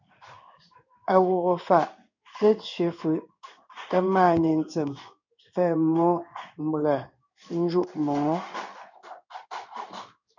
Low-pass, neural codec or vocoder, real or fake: 7.2 kHz; codec, 16 kHz in and 24 kHz out, 1 kbps, XY-Tokenizer; fake